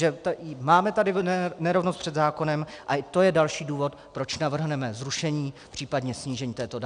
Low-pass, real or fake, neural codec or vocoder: 9.9 kHz; real; none